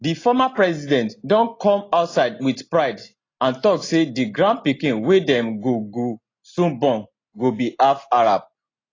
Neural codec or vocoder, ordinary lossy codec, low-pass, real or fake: none; AAC, 32 kbps; 7.2 kHz; real